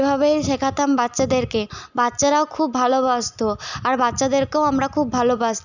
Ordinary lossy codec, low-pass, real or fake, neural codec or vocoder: none; 7.2 kHz; real; none